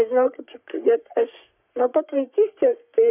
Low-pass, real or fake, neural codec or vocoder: 3.6 kHz; fake; codec, 44.1 kHz, 3.4 kbps, Pupu-Codec